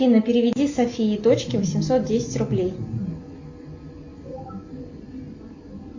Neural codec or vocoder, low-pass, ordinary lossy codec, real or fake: none; 7.2 kHz; AAC, 48 kbps; real